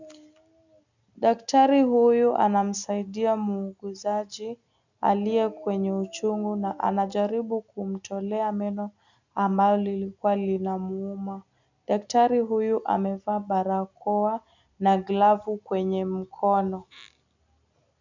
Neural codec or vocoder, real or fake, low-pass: none; real; 7.2 kHz